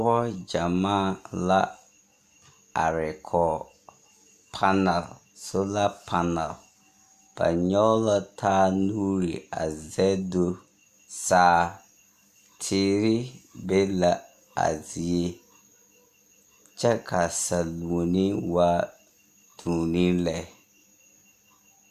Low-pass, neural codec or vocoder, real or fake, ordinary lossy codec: 14.4 kHz; none; real; AAC, 96 kbps